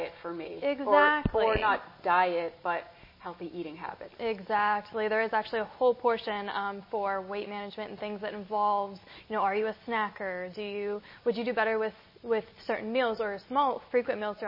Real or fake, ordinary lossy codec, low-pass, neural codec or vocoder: real; MP3, 24 kbps; 5.4 kHz; none